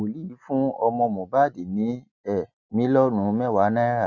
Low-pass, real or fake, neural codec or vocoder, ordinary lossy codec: 7.2 kHz; real; none; none